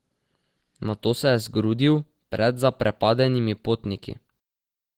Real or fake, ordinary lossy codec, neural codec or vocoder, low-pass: real; Opus, 16 kbps; none; 19.8 kHz